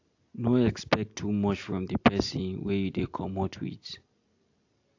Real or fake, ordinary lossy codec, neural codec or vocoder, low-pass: real; AAC, 48 kbps; none; 7.2 kHz